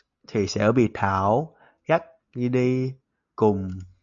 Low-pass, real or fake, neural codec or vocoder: 7.2 kHz; real; none